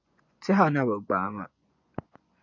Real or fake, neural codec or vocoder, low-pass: fake; vocoder, 24 kHz, 100 mel bands, Vocos; 7.2 kHz